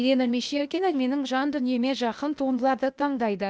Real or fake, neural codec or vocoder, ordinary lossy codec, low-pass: fake; codec, 16 kHz, 0.8 kbps, ZipCodec; none; none